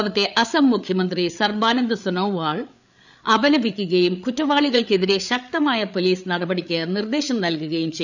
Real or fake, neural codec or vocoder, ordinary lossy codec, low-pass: fake; codec, 16 kHz, 8 kbps, FreqCodec, larger model; none; 7.2 kHz